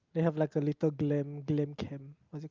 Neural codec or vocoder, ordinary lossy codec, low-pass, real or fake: none; Opus, 24 kbps; 7.2 kHz; real